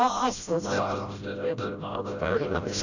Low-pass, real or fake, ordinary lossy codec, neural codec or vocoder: 7.2 kHz; fake; none; codec, 16 kHz, 0.5 kbps, FreqCodec, smaller model